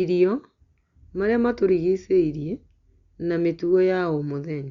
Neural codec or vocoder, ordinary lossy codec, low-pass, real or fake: none; Opus, 64 kbps; 7.2 kHz; real